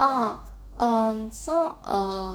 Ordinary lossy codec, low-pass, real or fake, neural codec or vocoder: none; none; fake; codec, 44.1 kHz, 2.6 kbps, DAC